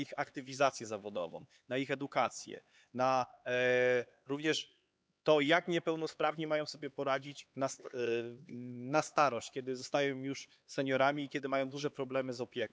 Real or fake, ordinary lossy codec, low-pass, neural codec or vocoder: fake; none; none; codec, 16 kHz, 4 kbps, X-Codec, HuBERT features, trained on LibriSpeech